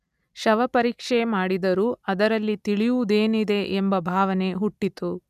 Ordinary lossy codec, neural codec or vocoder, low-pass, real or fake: none; none; 14.4 kHz; real